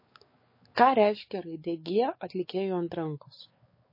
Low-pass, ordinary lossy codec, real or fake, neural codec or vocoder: 5.4 kHz; MP3, 24 kbps; fake; codec, 16 kHz, 4 kbps, X-Codec, WavLM features, trained on Multilingual LibriSpeech